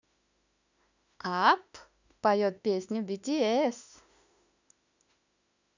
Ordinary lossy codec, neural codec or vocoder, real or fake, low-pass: none; autoencoder, 48 kHz, 32 numbers a frame, DAC-VAE, trained on Japanese speech; fake; 7.2 kHz